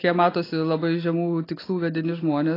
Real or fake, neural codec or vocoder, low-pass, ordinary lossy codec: real; none; 5.4 kHz; AAC, 24 kbps